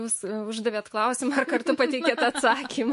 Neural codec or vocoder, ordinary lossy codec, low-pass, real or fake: autoencoder, 48 kHz, 128 numbers a frame, DAC-VAE, trained on Japanese speech; MP3, 48 kbps; 14.4 kHz; fake